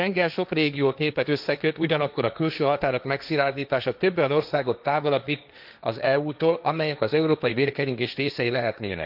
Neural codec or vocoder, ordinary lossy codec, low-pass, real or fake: codec, 16 kHz, 1.1 kbps, Voila-Tokenizer; none; 5.4 kHz; fake